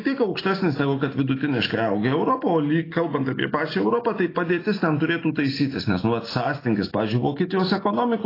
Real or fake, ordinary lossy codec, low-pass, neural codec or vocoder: real; AAC, 24 kbps; 5.4 kHz; none